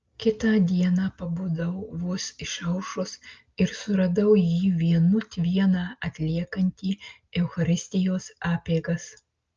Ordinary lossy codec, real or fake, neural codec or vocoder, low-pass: Opus, 24 kbps; real; none; 7.2 kHz